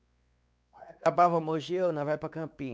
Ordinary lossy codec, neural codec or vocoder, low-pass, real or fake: none; codec, 16 kHz, 4 kbps, X-Codec, WavLM features, trained on Multilingual LibriSpeech; none; fake